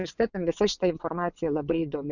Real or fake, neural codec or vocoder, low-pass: fake; vocoder, 22.05 kHz, 80 mel bands, WaveNeXt; 7.2 kHz